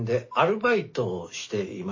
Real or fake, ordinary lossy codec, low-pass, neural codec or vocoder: real; MP3, 32 kbps; 7.2 kHz; none